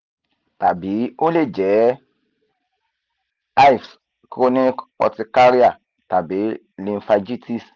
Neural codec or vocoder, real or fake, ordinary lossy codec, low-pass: none; real; Opus, 24 kbps; 7.2 kHz